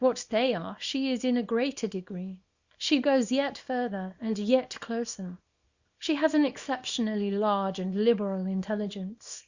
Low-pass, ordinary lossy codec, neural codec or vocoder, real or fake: 7.2 kHz; Opus, 64 kbps; codec, 24 kHz, 0.9 kbps, WavTokenizer, small release; fake